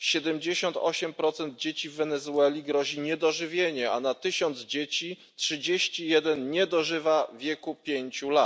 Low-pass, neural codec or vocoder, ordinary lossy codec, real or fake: none; none; none; real